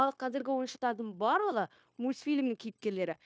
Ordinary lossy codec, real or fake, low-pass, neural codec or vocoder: none; fake; none; codec, 16 kHz, 0.9 kbps, LongCat-Audio-Codec